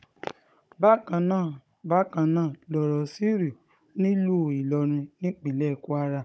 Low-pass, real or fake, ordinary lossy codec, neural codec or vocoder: none; fake; none; codec, 16 kHz, 16 kbps, FunCodec, trained on Chinese and English, 50 frames a second